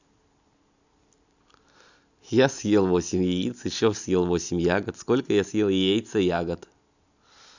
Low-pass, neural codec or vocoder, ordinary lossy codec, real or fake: 7.2 kHz; none; none; real